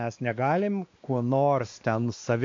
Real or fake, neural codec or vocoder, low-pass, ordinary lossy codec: fake; codec, 16 kHz, 2 kbps, X-Codec, WavLM features, trained on Multilingual LibriSpeech; 7.2 kHz; AAC, 48 kbps